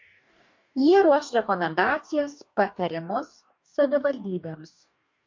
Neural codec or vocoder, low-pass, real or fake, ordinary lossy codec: codec, 44.1 kHz, 2.6 kbps, DAC; 7.2 kHz; fake; MP3, 48 kbps